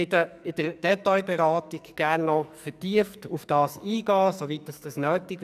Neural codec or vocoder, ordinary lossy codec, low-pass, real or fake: codec, 44.1 kHz, 2.6 kbps, SNAC; none; 14.4 kHz; fake